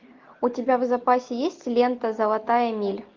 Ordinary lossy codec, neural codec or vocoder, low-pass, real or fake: Opus, 24 kbps; none; 7.2 kHz; real